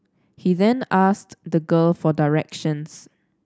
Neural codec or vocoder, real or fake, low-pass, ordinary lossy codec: none; real; none; none